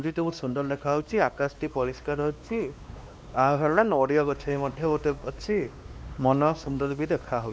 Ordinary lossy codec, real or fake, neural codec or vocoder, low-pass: none; fake; codec, 16 kHz, 2 kbps, X-Codec, WavLM features, trained on Multilingual LibriSpeech; none